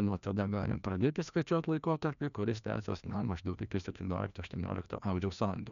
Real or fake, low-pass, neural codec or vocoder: fake; 7.2 kHz; codec, 16 kHz, 1 kbps, FreqCodec, larger model